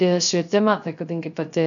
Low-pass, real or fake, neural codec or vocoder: 7.2 kHz; fake; codec, 16 kHz, 0.3 kbps, FocalCodec